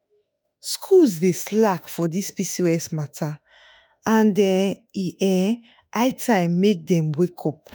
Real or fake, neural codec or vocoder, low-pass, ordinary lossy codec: fake; autoencoder, 48 kHz, 32 numbers a frame, DAC-VAE, trained on Japanese speech; none; none